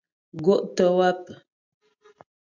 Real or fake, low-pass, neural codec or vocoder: real; 7.2 kHz; none